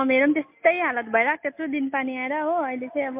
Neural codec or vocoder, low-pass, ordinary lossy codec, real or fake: none; 3.6 kHz; MP3, 32 kbps; real